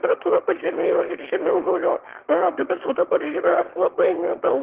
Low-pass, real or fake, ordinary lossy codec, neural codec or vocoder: 3.6 kHz; fake; Opus, 16 kbps; autoencoder, 22.05 kHz, a latent of 192 numbers a frame, VITS, trained on one speaker